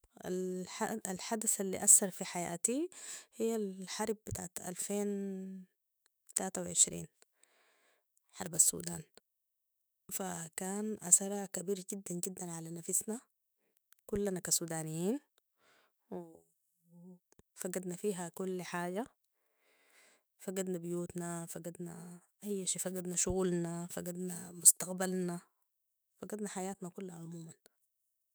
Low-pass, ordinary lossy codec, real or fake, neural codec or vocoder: none; none; fake; autoencoder, 48 kHz, 128 numbers a frame, DAC-VAE, trained on Japanese speech